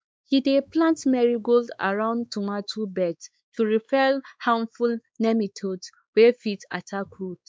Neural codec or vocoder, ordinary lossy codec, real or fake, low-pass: codec, 16 kHz, 4 kbps, X-Codec, WavLM features, trained on Multilingual LibriSpeech; none; fake; none